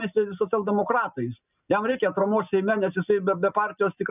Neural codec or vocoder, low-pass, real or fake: none; 3.6 kHz; real